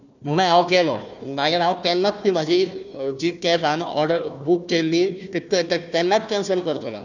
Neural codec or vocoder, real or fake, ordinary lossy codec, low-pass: codec, 16 kHz, 1 kbps, FunCodec, trained on Chinese and English, 50 frames a second; fake; none; 7.2 kHz